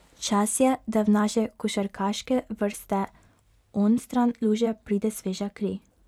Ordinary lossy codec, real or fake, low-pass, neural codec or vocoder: none; fake; 19.8 kHz; vocoder, 44.1 kHz, 128 mel bands every 512 samples, BigVGAN v2